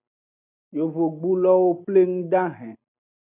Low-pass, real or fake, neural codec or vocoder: 3.6 kHz; real; none